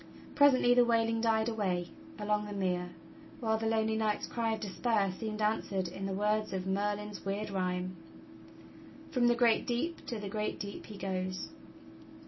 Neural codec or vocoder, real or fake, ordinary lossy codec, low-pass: none; real; MP3, 24 kbps; 7.2 kHz